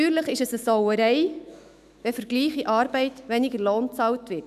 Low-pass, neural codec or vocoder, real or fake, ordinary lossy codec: 14.4 kHz; autoencoder, 48 kHz, 128 numbers a frame, DAC-VAE, trained on Japanese speech; fake; none